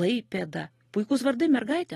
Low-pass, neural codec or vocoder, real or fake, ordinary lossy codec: 19.8 kHz; none; real; AAC, 32 kbps